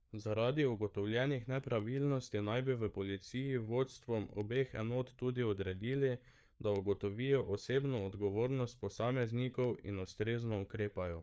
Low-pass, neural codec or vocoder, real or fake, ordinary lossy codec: none; codec, 16 kHz, 4 kbps, FreqCodec, larger model; fake; none